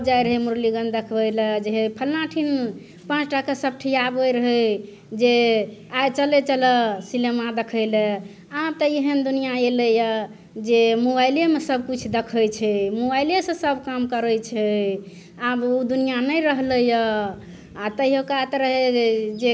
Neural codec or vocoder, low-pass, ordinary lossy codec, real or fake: none; none; none; real